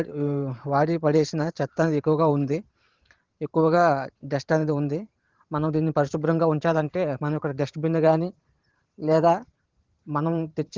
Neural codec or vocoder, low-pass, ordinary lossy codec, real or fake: vocoder, 44.1 kHz, 128 mel bands every 512 samples, BigVGAN v2; 7.2 kHz; Opus, 16 kbps; fake